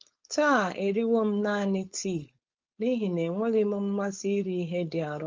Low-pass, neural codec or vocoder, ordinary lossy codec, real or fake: 7.2 kHz; codec, 16 kHz, 4.8 kbps, FACodec; Opus, 32 kbps; fake